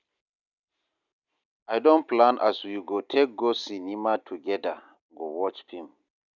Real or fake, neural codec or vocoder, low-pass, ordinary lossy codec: real; none; 7.2 kHz; none